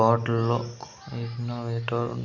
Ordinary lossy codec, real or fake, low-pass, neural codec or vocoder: none; real; 7.2 kHz; none